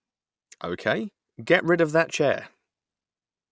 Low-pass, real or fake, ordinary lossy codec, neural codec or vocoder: none; real; none; none